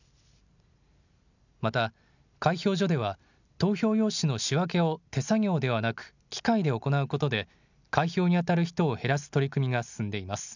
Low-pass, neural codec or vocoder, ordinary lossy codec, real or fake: 7.2 kHz; none; none; real